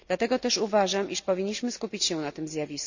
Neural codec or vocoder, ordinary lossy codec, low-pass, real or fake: none; none; 7.2 kHz; real